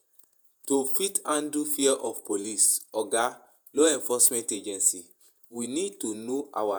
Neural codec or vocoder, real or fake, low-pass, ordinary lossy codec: vocoder, 48 kHz, 128 mel bands, Vocos; fake; none; none